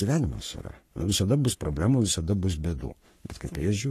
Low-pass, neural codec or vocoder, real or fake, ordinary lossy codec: 14.4 kHz; codec, 44.1 kHz, 3.4 kbps, Pupu-Codec; fake; AAC, 48 kbps